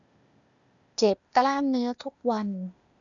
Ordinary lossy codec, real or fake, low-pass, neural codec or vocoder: none; fake; 7.2 kHz; codec, 16 kHz, 0.8 kbps, ZipCodec